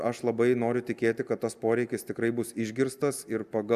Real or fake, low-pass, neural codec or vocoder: real; 14.4 kHz; none